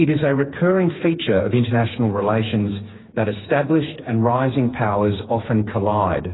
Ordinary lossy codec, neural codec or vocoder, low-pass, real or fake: AAC, 16 kbps; codec, 16 kHz, 4 kbps, FreqCodec, smaller model; 7.2 kHz; fake